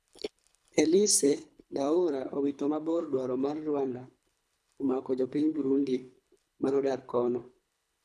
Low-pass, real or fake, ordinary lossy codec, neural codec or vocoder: none; fake; none; codec, 24 kHz, 3 kbps, HILCodec